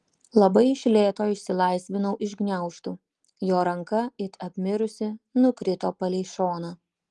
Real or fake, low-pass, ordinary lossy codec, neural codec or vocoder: real; 10.8 kHz; Opus, 24 kbps; none